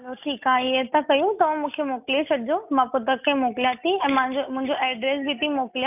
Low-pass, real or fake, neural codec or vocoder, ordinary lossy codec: 3.6 kHz; real; none; none